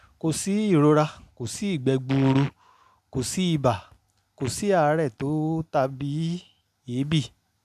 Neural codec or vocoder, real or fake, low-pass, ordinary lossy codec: none; real; 14.4 kHz; none